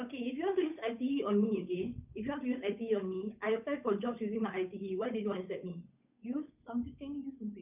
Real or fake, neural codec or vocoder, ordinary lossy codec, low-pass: fake; codec, 16 kHz, 8 kbps, FunCodec, trained on Chinese and English, 25 frames a second; none; 3.6 kHz